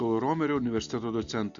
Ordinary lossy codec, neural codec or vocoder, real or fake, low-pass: Opus, 64 kbps; none; real; 7.2 kHz